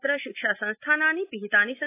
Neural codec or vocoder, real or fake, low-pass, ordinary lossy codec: none; real; 3.6 kHz; AAC, 24 kbps